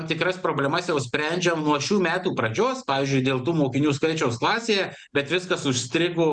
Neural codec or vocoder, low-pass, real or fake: none; 9.9 kHz; real